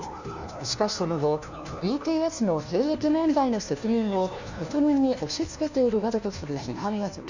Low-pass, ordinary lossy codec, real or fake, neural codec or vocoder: 7.2 kHz; none; fake; codec, 16 kHz, 1 kbps, FunCodec, trained on LibriTTS, 50 frames a second